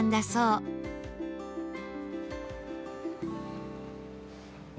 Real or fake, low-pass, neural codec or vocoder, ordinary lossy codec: real; none; none; none